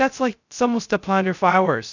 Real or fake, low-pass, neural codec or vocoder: fake; 7.2 kHz; codec, 16 kHz, 0.2 kbps, FocalCodec